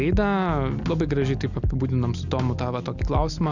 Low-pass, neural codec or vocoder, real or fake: 7.2 kHz; none; real